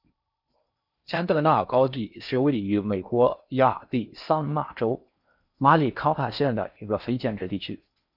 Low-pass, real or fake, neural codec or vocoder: 5.4 kHz; fake; codec, 16 kHz in and 24 kHz out, 0.6 kbps, FocalCodec, streaming, 4096 codes